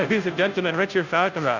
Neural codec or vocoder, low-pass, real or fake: codec, 16 kHz, 0.5 kbps, FunCodec, trained on Chinese and English, 25 frames a second; 7.2 kHz; fake